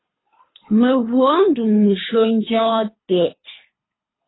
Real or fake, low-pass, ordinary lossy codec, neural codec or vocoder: fake; 7.2 kHz; AAC, 16 kbps; codec, 24 kHz, 3 kbps, HILCodec